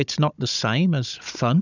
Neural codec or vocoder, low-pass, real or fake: codec, 16 kHz, 16 kbps, FunCodec, trained on Chinese and English, 50 frames a second; 7.2 kHz; fake